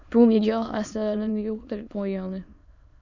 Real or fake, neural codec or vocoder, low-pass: fake; autoencoder, 22.05 kHz, a latent of 192 numbers a frame, VITS, trained on many speakers; 7.2 kHz